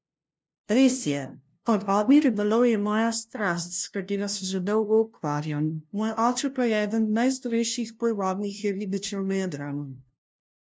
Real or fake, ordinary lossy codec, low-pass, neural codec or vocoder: fake; none; none; codec, 16 kHz, 0.5 kbps, FunCodec, trained on LibriTTS, 25 frames a second